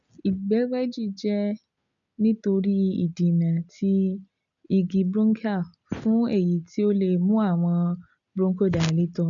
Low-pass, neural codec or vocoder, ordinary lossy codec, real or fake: 7.2 kHz; none; none; real